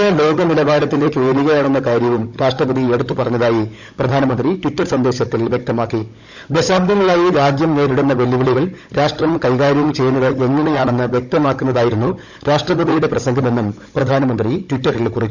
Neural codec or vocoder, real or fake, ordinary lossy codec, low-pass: codec, 16 kHz, 16 kbps, FunCodec, trained on Chinese and English, 50 frames a second; fake; none; 7.2 kHz